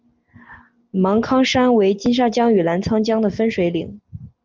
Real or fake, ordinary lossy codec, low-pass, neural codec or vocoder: real; Opus, 32 kbps; 7.2 kHz; none